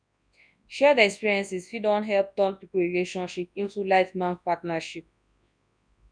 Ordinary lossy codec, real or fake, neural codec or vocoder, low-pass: none; fake; codec, 24 kHz, 0.9 kbps, WavTokenizer, large speech release; 9.9 kHz